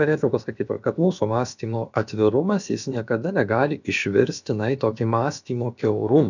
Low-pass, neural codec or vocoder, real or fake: 7.2 kHz; codec, 16 kHz, about 1 kbps, DyCAST, with the encoder's durations; fake